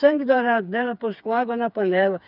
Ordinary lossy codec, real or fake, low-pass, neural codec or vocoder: none; fake; 5.4 kHz; codec, 16 kHz, 4 kbps, FreqCodec, smaller model